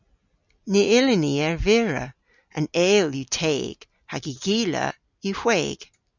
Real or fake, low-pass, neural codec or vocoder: real; 7.2 kHz; none